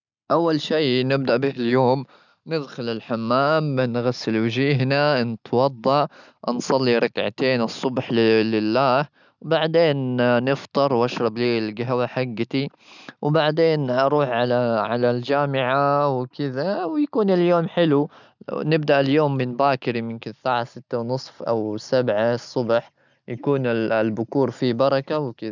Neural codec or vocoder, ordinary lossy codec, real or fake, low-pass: none; none; real; 7.2 kHz